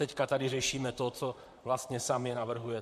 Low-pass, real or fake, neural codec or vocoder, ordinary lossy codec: 14.4 kHz; fake; vocoder, 44.1 kHz, 128 mel bands, Pupu-Vocoder; MP3, 64 kbps